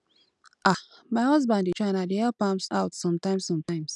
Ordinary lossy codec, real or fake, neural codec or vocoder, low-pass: none; real; none; 10.8 kHz